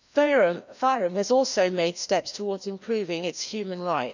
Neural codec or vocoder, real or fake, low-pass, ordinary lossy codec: codec, 16 kHz, 1 kbps, FreqCodec, larger model; fake; 7.2 kHz; none